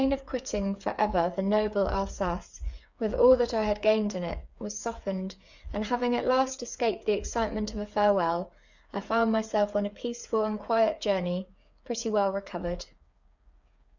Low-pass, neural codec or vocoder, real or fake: 7.2 kHz; codec, 16 kHz, 8 kbps, FreqCodec, smaller model; fake